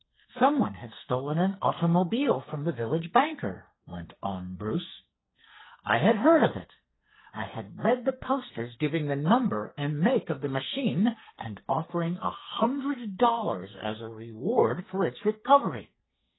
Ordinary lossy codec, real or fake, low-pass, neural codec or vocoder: AAC, 16 kbps; fake; 7.2 kHz; codec, 44.1 kHz, 2.6 kbps, SNAC